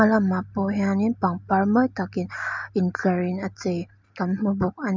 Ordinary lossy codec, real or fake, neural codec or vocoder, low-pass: MP3, 64 kbps; real; none; 7.2 kHz